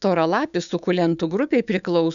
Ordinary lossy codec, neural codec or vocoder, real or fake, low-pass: MP3, 96 kbps; codec, 16 kHz, 6 kbps, DAC; fake; 7.2 kHz